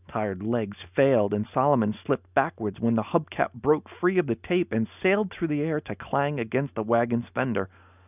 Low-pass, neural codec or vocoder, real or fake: 3.6 kHz; none; real